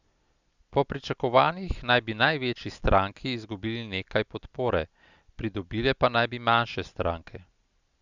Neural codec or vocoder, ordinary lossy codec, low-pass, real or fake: none; Opus, 64 kbps; 7.2 kHz; real